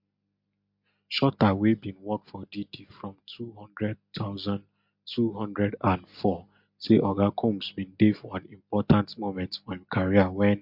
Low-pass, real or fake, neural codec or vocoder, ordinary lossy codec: 5.4 kHz; real; none; MP3, 48 kbps